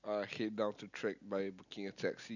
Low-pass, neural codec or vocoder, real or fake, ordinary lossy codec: 7.2 kHz; none; real; none